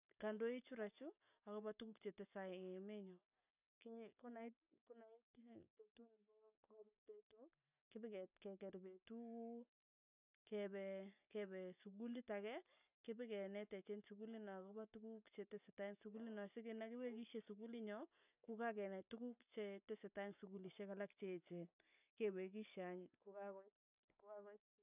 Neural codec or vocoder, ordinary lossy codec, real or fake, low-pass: none; none; real; 3.6 kHz